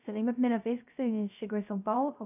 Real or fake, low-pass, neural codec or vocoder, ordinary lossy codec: fake; 3.6 kHz; codec, 16 kHz, 0.3 kbps, FocalCodec; none